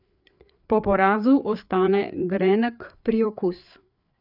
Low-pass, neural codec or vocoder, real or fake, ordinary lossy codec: 5.4 kHz; codec, 16 kHz, 4 kbps, FreqCodec, larger model; fake; none